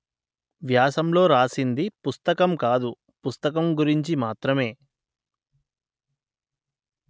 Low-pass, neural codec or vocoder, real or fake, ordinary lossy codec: none; none; real; none